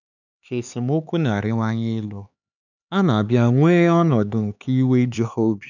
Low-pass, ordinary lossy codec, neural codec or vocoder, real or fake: 7.2 kHz; none; codec, 16 kHz, 4 kbps, X-Codec, HuBERT features, trained on LibriSpeech; fake